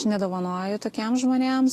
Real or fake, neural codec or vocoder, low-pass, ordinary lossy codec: real; none; 14.4 kHz; AAC, 48 kbps